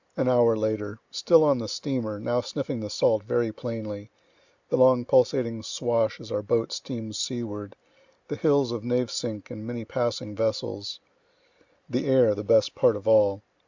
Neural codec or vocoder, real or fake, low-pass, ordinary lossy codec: none; real; 7.2 kHz; Opus, 64 kbps